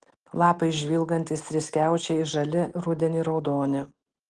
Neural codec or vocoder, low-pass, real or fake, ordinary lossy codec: none; 9.9 kHz; real; Opus, 16 kbps